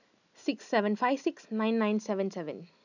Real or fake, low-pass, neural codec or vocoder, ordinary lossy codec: real; 7.2 kHz; none; none